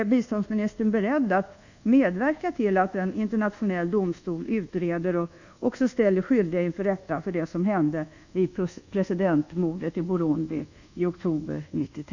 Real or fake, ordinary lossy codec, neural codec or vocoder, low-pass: fake; none; codec, 24 kHz, 1.2 kbps, DualCodec; 7.2 kHz